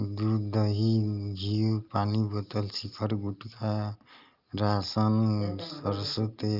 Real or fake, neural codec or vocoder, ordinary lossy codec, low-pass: real; none; Opus, 24 kbps; 5.4 kHz